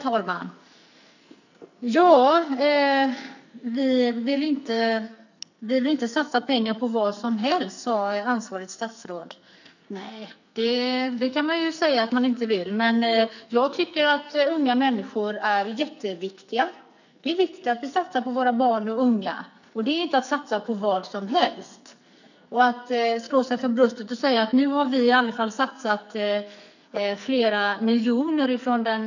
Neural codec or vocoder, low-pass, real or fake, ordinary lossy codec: codec, 44.1 kHz, 2.6 kbps, SNAC; 7.2 kHz; fake; none